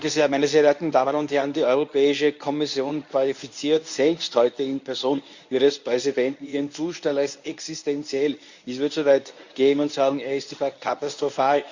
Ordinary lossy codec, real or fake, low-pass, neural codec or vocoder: Opus, 64 kbps; fake; 7.2 kHz; codec, 24 kHz, 0.9 kbps, WavTokenizer, medium speech release version 1